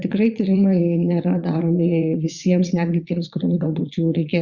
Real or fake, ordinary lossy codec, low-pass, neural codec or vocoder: fake; Opus, 64 kbps; 7.2 kHz; vocoder, 22.05 kHz, 80 mel bands, Vocos